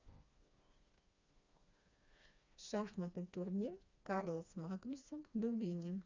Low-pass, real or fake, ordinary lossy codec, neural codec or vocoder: 7.2 kHz; fake; Opus, 64 kbps; codec, 16 kHz, 2 kbps, FreqCodec, smaller model